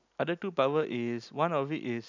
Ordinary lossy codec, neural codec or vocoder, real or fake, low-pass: none; none; real; 7.2 kHz